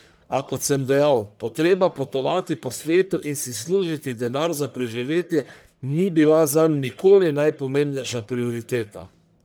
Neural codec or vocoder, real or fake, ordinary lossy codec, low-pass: codec, 44.1 kHz, 1.7 kbps, Pupu-Codec; fake; none; none